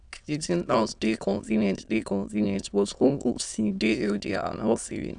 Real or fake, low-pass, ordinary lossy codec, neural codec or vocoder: fake; 9.9 kHz; none; autoencoder, 22.05 kHz, a latent of 192 numbers a frame, VITS, trained on many speakers